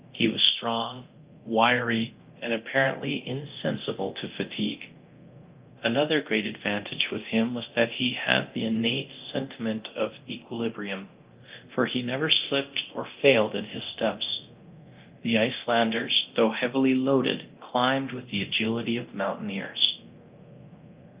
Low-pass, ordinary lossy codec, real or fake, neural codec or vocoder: 3.6 kHz; Opus, 32 kbps; fake; codec, 24 kHz, 0.9 kbps, DualCodec